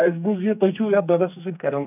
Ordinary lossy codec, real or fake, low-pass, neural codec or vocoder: none; fake; 3.6 kHz; codec, 44.1 kHz, 2.6 kbps, SNAC